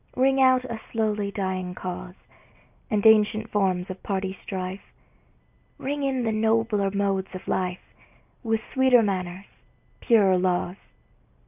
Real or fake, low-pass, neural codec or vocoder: real; 3.6 kHz; none